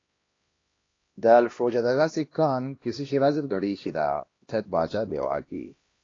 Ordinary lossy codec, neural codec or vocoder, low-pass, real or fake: AAC, 32 kbps; codec, 16 kHz, 1 kbps, X-Codec, HuBERT features, trained on LibriSpeech; 7.2 kHz; fake